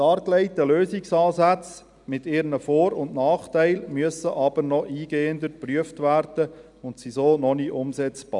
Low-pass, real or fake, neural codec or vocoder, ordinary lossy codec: 10.8 kHz; real; none; none